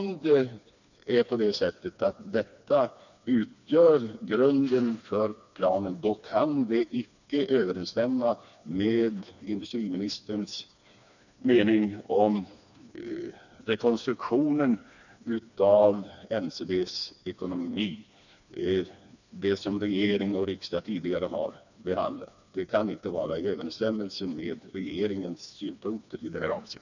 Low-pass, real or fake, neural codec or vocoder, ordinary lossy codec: 7.2 kHz; fake; codec, 16 kHz, 2 kbps, FreqCodec, smaller model; none